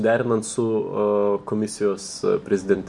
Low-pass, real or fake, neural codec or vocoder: 10.8 kHz; real; none